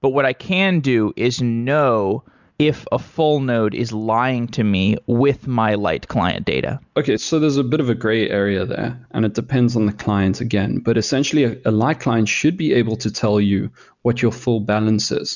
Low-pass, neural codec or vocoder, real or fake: 7.2 kHz; none; real